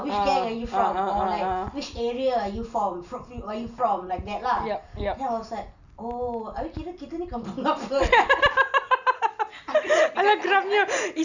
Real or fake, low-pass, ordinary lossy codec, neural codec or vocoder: real; 7.2 kHz; none; none